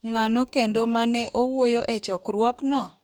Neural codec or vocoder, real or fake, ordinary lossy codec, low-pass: codec, 44.1 kHz, 2.6 kbps, DAC; fake; none; none